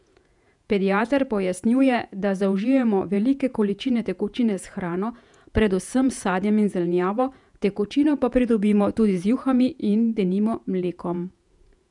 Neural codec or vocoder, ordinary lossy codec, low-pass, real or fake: vocoder, 48 kHz, 128 mel bands, Vocos; none; 10.8 kHz; fake